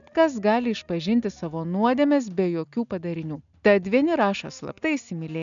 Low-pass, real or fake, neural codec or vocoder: 7.2 kHz; real; none